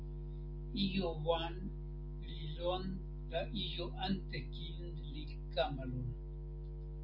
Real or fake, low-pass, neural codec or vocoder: real; 5.4 kHz; none